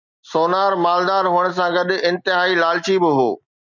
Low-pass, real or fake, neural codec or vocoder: 7.2 kHz; real; none